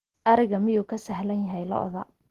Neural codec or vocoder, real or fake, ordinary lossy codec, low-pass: none; real; Opus, 16 kbps; 14.4 kHz